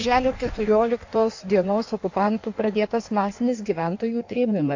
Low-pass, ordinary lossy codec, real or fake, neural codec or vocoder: 7.2 kHz; AAC, 48 kbps; fake; codec, 16 kHz in and 24 kHz out, 1.1 kbps, FireRedTTS-2 codec